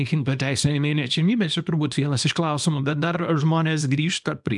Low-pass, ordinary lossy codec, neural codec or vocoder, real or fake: 10.8 kHz; MP3, 96 kbps; codec, 24 kHz, 0.9 kbps, WavTokenizer, small release; fake